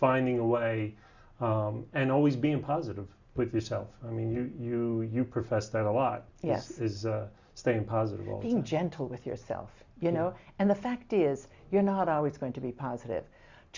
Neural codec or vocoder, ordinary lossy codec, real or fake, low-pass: none; Opus, 64 kbps; real; 7.2 kHz